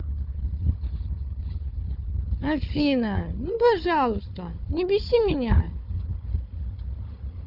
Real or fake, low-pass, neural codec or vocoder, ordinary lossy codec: fake; 5.4 kHz; codec, 16 kHz, 4 kbps, FunCodec, trained on Chinese and English, 50 frames a second; none